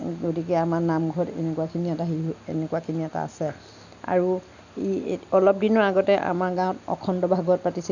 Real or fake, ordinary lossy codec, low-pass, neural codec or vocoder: real; none; 7.2 kHz; none